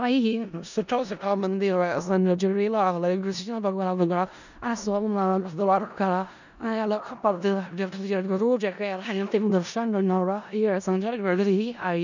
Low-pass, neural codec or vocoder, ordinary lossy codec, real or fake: 7.2 kHz; codec, 16 kHz in and 24 kHz out, 0.4 kbps, LongCat-Audio-Codec, four codebook decoder; none; fake